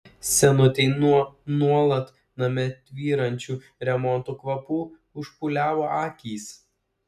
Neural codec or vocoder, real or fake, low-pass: none; real; 14.4 kHz